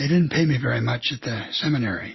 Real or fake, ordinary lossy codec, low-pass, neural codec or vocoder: real; MP3, 24 kbps; 7.2 kHz; none